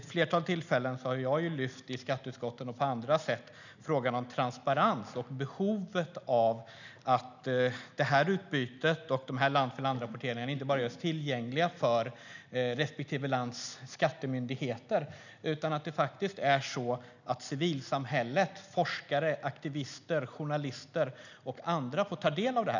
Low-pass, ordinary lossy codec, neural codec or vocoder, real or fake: 7.2 kHz; none; none; real